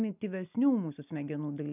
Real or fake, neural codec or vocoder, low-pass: real; none; 3.6 kHz